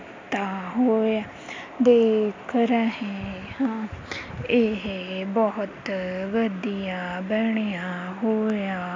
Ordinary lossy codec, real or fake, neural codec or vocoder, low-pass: MP3, 64 kbps; real; none; 7.2 kHz